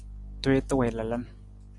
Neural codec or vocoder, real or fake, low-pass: none; real; 10.8 kHz